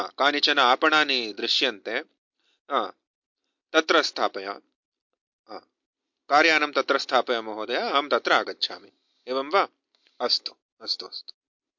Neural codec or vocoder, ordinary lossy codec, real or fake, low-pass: none; MP3, 48 kbps; real; 7.2 kHz